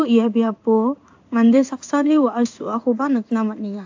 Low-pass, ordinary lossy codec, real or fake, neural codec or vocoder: 7.2 kHz; AAC, 48 kbps; fake; codec, 16 kHz in and 24 kHz out, 1 kbps, XY-Tokenizer